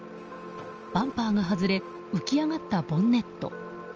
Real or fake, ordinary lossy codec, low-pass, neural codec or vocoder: real; Opus, 24 kbps; 7.2 kHz; none